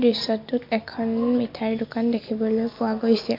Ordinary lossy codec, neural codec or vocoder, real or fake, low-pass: MP3, 32 kbps; none; real; 5.4 kHz